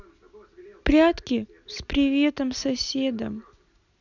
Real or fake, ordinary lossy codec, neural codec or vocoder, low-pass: real; none; none; 7.2 kHz